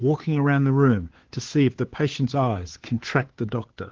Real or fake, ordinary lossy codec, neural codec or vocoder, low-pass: fake; Opus, 16 kbps; codec, 16 kHz, 2 kbps, FunCodec, trained on Chinese and English, 25 frames a second; 7.2 kHz